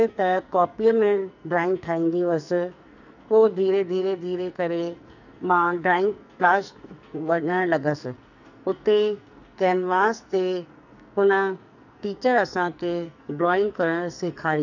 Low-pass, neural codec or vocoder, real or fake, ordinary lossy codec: 7.2 kHz; codec, 44.1 kHz, 2.6 kbps, SNAC; fake; none